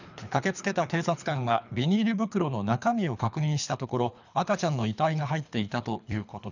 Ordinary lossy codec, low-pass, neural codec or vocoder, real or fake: none; 7.2 kHz; codec, 24 kHz, 3 kbps, HILCodec; fake